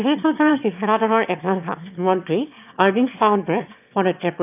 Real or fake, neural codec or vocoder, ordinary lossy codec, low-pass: fake; autoencoder, 22.05 kHz, a latent of 192 numbers a frame, VITS, trained on one speaker; none; 3.6 kHz